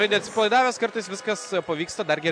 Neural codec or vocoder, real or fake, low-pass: none; real; 9.9 kHz